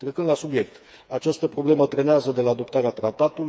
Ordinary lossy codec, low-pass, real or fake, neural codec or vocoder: none; none; fake; codec, 16 kHz, 4 kbps, FreqCodec, smaller model